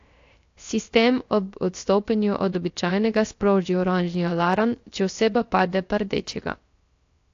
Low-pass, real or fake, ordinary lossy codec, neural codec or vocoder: 7.2 kHz; fake; AAC, 48 kbps; codec, 16 kHz, 0.3 kbps, FocalCodec